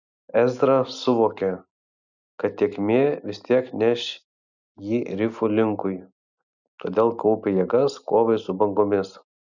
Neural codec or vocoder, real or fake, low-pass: none; real; 7.2 kHz